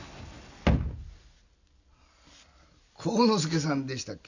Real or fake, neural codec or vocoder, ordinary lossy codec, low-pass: real; none; none; 7.2 kHz